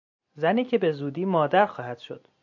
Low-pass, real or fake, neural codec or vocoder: 7.2 kHz; real; none